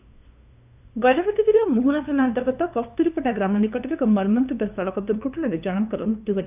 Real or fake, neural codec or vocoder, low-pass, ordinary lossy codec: fake; codec, 16 kHz, 2 kbps, FunCodec, trained on LibriTTS, 25 frames a second; 3.6 kHz; none